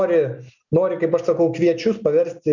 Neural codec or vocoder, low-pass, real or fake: none; 7.2 kHz; real